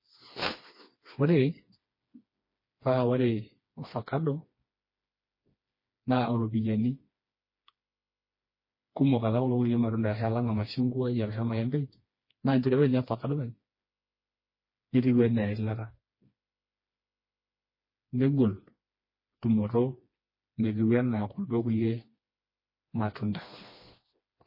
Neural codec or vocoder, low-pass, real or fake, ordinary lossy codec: codec, 16 kHz, 2 kbps, FreqCodec, smaller model; 5.4 kHz; fake; MP3, 24 kbps